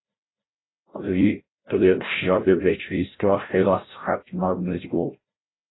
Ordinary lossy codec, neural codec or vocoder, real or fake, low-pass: AAC, 16 kbps; codec, 16 kHz, 0.5 kbps, FreqCodec, larger model; fake; 7.2 kHz